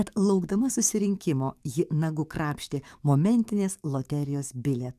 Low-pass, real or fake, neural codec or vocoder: 14.4 kHz; fake; codec, 44.1 kHz, 7.8 kbps, DAC